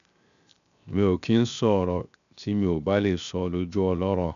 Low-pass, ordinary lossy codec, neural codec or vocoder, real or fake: 7.2 kHz; none; codec, 16 kHz, 0.7 kbps, FocalCodec; fake